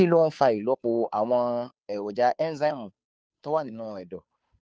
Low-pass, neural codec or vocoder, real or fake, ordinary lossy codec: none; codec, 16 kHz, 2 kbps, FunCodec, trained on Chinese and English, 25 frames a second; fake; none